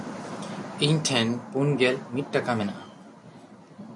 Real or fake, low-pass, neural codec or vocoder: real; 10.8 kHz; none